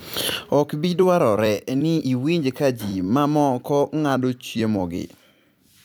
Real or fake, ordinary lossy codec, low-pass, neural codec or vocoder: fake; none; none; vocoder, 44.1 kHz, 128 mel bands every 256 samples, BigVGAN v2